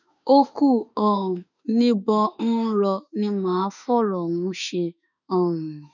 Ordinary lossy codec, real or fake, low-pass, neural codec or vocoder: none; fake; 7.2 kHz; autoencoder, 48 kHz, 32 numbers a frame, DAC-VAE, trained on Japanese speech